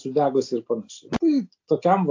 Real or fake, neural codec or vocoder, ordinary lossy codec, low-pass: real; none; AAC, 48 kbps; 7.2 kHz